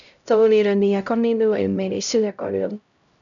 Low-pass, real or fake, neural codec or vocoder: 7.2 kHz; fake; codec, 16 kHz, 0.5 kbps, X-Codec, HuBERT features, trained on LibriSpeech